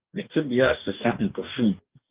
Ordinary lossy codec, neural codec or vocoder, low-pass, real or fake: Opus, 24 kbps; codec, 44.1 kHz, 2.6 kbps, DAC; 3.6 kHz; fake